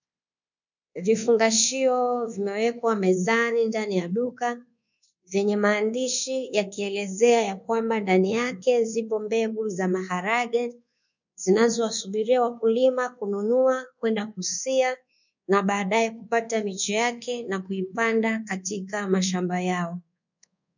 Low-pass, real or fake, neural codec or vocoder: 7.2 kHz; fake; codec, 24 kHz, 1.2 kbps, DualCodec